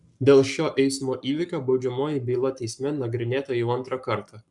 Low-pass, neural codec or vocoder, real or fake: 10.8 kHz; codec, 44.1 kHz, 7.8 kbps, Pupu-Codec; fake